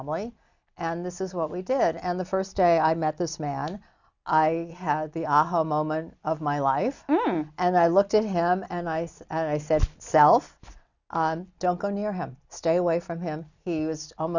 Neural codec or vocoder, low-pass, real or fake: none; 7.2 kHz; real